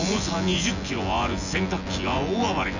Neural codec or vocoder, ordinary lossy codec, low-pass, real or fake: vocoder, 24 kHz, 100 mel bands, Vocos; none; 7.2 kHz; fake